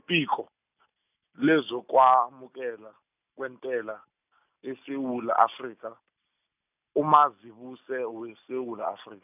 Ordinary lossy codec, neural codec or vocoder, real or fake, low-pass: none; none; real; 3.6 kHz